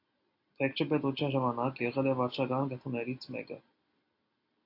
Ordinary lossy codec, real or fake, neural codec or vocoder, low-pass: AAC, 32 kbps; real; none; 5.4 kHz